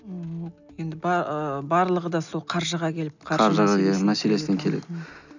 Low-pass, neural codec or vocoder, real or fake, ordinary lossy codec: 7.2 kHz; none; real; none